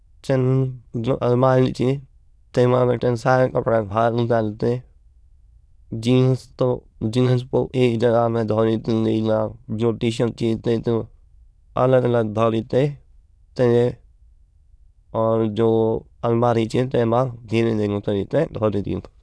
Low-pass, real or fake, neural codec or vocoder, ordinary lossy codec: none; fake; autoencoder, 22.05 kHz, a latent of 192 numbers a frame, VITS, trained on many speakers; none